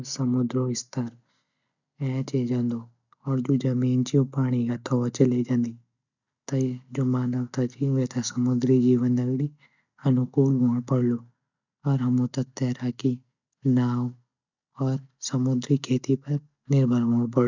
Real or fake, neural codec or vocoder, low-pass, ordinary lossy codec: real; none; 7.2 kHz; none